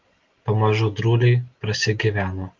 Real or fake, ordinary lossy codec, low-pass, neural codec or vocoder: real; Opus, 32 kbps; 7.2 kHz; none